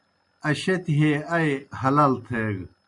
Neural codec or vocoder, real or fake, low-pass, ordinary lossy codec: none; real; 10.8 kHz; MP3, 64 kbps